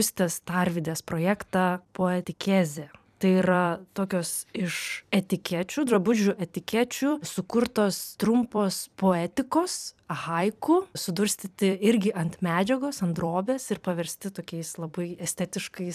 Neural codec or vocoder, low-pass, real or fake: vocoder, 44.1 kHz, 128 mel bands every 256 samples, BigVGAN v2; 14.4 kHz; fake